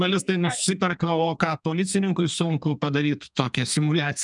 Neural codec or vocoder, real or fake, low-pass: codec, 32 kHz, 1.9 kbps, SNAC; fake; 10.8 kHz